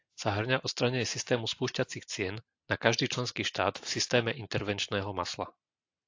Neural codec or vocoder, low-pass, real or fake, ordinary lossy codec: none; 7.2 kHz; real; AAC, 48 kbps